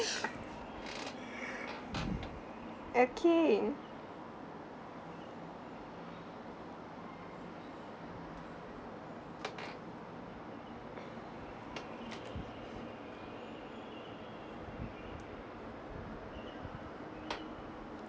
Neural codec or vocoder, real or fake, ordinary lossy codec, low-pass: none; real; none; none